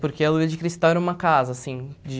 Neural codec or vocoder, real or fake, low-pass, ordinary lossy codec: none; real; none; none